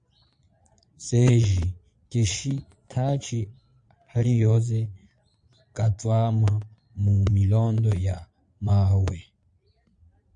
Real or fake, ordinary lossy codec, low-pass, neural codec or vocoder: fake; MP3, 64 kbps; 9.9 kHz; vocoder, 22.05 kHz, 80 mel bands, Vocos